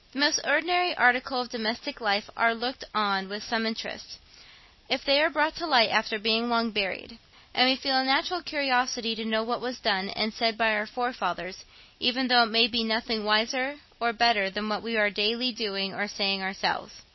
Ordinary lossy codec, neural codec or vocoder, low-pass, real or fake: MP3, 24 kbps; none; 7.2 kHz; real